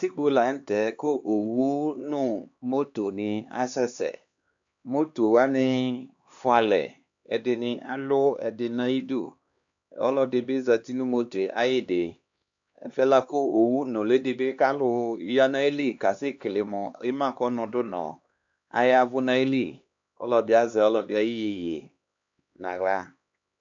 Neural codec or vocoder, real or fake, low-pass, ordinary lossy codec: codec, 16 kHz, 2 kbps, X-Codec, HuBERT features, trained on LibriSpeech; fake; 7.2 kHz; AAC, 64 kbps